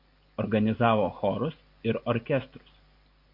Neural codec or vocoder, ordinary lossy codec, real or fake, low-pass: none; MP3, 48 kbps; real; 5.4 kHz